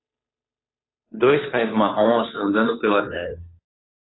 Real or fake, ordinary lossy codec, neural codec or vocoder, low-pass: fake; AAC, 16 kbps; codec, 16 kHz, 2 kbps, FunCodec, trained on Chinese and English, 25 frames a second; 7.2 kHz